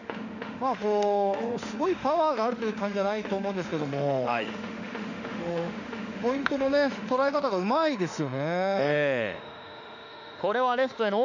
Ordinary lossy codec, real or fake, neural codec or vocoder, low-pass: none; fake; autoencoder, 48 kHz, 32 numbers a frame, DAC-VAE, trained on Japanese speech; 7.2 kHz